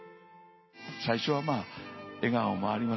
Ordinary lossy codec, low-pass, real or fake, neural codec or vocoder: MP3, 24 kbps; 7.2 kHz; real; none